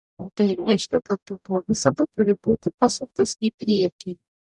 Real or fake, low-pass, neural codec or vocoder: fake; 10.8 kHz; codec, 44.1 kHz, 0.9 kbps, DAC